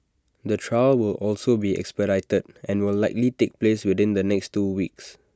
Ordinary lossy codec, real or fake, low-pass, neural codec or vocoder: none; real; none; none